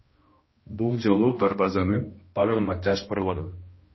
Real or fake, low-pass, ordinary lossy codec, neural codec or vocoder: fake; 7.2 kHz; MP3, 24 kbps; codec, 16 kHz, 1 kbps, X-Codec, HuBERT features, trained on balanced general audio